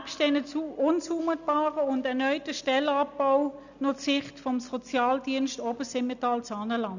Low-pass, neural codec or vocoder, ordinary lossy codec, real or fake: 7.2 kHz; none; none; real